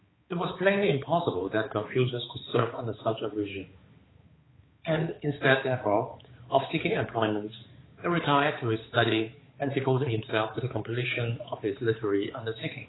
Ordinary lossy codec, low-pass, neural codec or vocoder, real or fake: AAC, 16 kbps; 7.2 kHz; codec, 16 kHz, 4 kbps, X-Codec, HuBERT features, trained on balanced general audio; fake